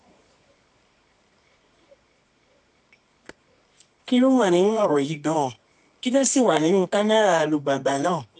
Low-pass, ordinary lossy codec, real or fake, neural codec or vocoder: none; none; fake; codec, 24 kHz, 0.9 kbps, WavTokenizer, medium music audio release